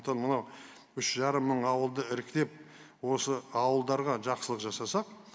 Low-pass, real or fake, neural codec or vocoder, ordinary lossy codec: none; real; none; none